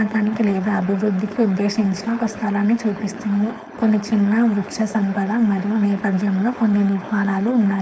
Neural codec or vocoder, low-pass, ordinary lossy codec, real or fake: codec, 16 kHz, 4.8 kbps, FACodec; none; none; fake